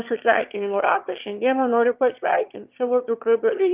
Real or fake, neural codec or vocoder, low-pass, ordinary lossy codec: fake; autoencoder, 22.05 kHz, a latent of 192 numbers a frame, VITS, trained on one speaker; 3.6 kHz; Opus, 32 kbps